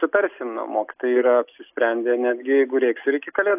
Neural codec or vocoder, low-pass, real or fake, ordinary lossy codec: none; 3.6 kHz; real; AAC, 32 kbps